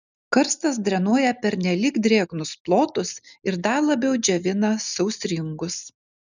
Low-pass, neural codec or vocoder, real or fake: 7.2 kHz; none; real